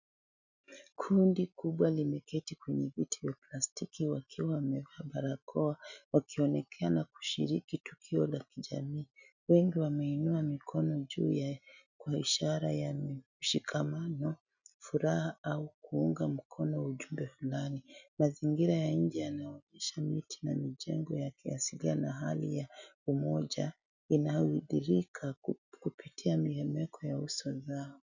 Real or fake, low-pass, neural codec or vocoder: real; 7.2 kHz; none